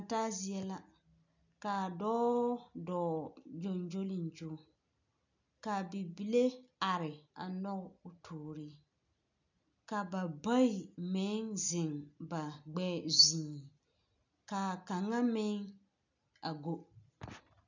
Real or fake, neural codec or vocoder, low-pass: real; none; 7.2 kHz